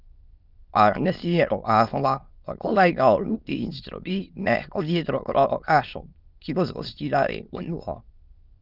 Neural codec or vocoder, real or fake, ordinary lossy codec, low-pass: autoencoder, 22.05 kHz, a latent of 192 numbers a frame, VITS, trained on many speakers; fake; Opus, 24 kbps; 5.4 kHz